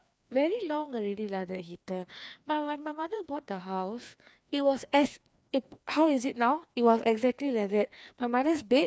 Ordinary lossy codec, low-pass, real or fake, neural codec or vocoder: none; none; fake; codec, 16 kHz, 2 kbps, FreqCodec, larger model